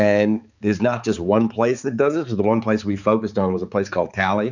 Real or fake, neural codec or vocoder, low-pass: fake; codec, 16 kHz, 4 kbps, X-Codec, HuBERT features, trained on balanced general audio; 7.2 kHz